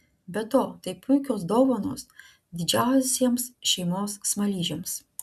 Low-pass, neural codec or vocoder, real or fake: 14.4 kHz; none; real